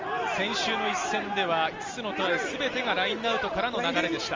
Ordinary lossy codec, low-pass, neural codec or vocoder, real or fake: Opus, 32 kbps; 7.2 kHz; none; real